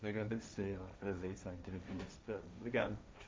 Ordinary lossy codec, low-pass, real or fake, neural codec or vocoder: none; none; fake; codec, 16 kHz, 1.1 kbps, Voila-Tokenizer